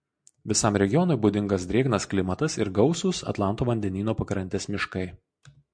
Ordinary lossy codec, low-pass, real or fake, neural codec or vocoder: MP3, 64 kbps; 9.9 kHz; real; none